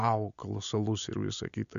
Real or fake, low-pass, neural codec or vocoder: real; 7.2 kHz; none